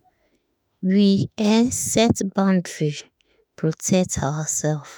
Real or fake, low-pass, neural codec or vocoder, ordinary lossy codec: fake; none; autoencoder, 48 kHz, 32 numbers a frame, DAC-VAE, trained on Japanese speech; none